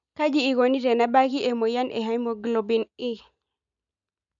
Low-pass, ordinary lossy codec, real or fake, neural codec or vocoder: 7.2 kHz; none; real; none